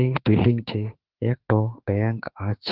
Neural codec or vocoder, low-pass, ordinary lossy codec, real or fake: autoencoder, 48 kHz, 32 numbers a frame, DAC-VAE, trained on Japanese speech; 5.4 kHz; Opus, 16 kbps; fake